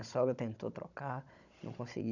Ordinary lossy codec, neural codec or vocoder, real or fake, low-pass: none; codec, 16 kHz, 16 kbps, FunCodec, trained on Chinese and English, 50 frames a second; fake; 7.2 kHz